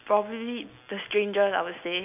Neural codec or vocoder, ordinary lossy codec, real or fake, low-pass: none; none; real; 3.6 kHz